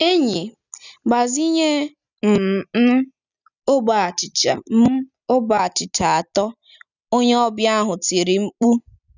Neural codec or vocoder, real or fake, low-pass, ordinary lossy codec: none; real; 7.2 kHz; none